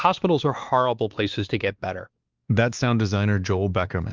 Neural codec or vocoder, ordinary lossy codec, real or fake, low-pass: codec, 16 kHz, 2 kbps, X-Codec, WavLM features, trained on Multilingual LibriSpeech; Opus, 24 kbps; fake; 7.2 kHz